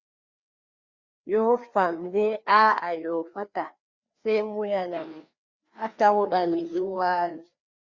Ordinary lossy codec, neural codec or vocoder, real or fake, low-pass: Opus, 64 kbps; codec, 16 kHz, 2 kbps, FreqCodec, larger model; fake; 7.2 kHz